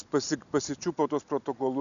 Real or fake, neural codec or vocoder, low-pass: real; none; 7.2 kHz